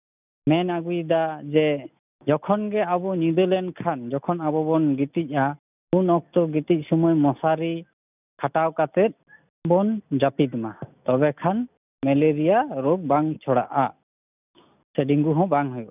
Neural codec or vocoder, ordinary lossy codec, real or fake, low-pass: none; none; real; 3.6 kHz